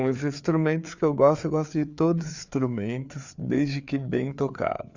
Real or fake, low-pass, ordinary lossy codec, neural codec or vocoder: fake; 7.2 kHz; Opus, 64 kbps; codec, 16 kHz, 4 kbps, FreqCodec, larger model